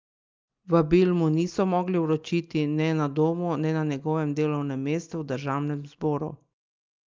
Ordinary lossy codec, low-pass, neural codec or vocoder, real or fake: Opus, 32 kbps; 7.2 kHz; none; real